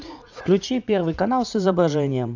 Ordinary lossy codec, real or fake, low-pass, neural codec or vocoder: none; fake; 7.2 kHz; vocoder, 44.1 kHz, 80 mel bands, Vocos